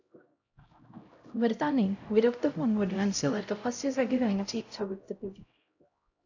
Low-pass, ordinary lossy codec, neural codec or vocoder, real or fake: 7.2 kHz; AAC, 48 kbps; codec, 16 kHz, 0.5 kbps, X-Codec, HuBERT features, trained on LibriSpeech; fake